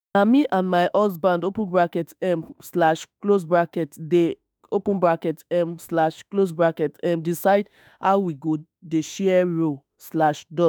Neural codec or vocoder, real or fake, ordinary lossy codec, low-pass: autoencoder, 48 kHz, 32 numbers a frame, DAC-VAE, trained on Japanese speech; fake; none; none